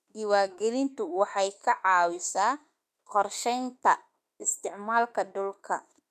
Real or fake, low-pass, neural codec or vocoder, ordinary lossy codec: fake; 14.4 kHz; autoencoder, 48 kHz, 32 numbers a frame, DAC-VAE, trained on Japanese speech; none